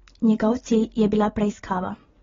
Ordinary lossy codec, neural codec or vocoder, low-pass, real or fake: AAC, 24 kbps; none; 7.2 kHz; real